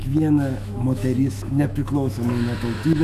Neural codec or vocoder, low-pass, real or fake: autoencoder, 48 kHz, 128 numbers a frame, DAC-VAE, trained on Japanese speech; 14.4 kHz; fake